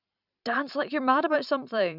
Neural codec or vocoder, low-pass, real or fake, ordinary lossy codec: vocoder, 44.1 kHz, 128 mel bands every 512 samples, BigVGAN v2; 5.4 kHz; fake; none